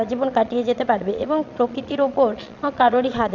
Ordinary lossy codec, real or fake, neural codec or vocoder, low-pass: none; fake; vocoder, 22.05 kHz, 80 mel bands, Vocos; 7.2 kHz